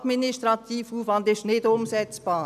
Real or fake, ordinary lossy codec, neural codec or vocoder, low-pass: real; none; none; 14.4 kHz